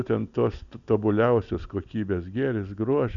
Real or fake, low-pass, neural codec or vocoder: real; 7.2 kHz; none